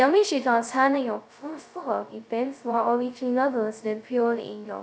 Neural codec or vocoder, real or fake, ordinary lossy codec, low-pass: codec, 16 kHz, 0.2 kbps, FocalCodec; fake; none; none